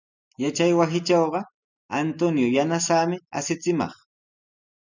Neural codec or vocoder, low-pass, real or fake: none; 7.2 kHz; real